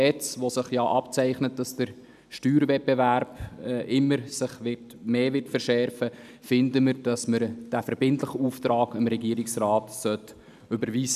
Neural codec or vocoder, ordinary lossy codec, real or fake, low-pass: none; none; real; 14.4 kHz